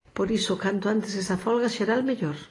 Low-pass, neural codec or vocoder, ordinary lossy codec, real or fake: 10.8 kHz; none; AAC, 32 kbps; real